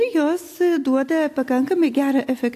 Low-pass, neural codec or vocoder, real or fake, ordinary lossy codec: 14.4 kHz; none; real; AAC, 64 kbps